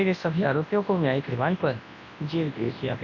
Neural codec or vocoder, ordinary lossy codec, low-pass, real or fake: codec, 24 kHz, 0.9 kbps, WavTokenizer, large speech release; none; 7.2 kHz; fake